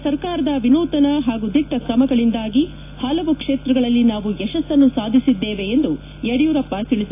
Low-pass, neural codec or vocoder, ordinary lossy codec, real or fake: 3.6 kHz; none; AAC, 24 kbps; real